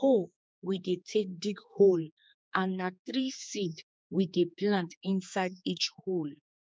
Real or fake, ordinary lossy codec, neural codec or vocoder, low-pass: fake; none; codec, 16 kHz, 4 kbps, X-Codec, HuBERT features, trained on general audio; none